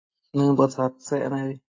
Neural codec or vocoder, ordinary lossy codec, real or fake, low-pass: none; AAC, 32 kbps; real; 7.2 kHz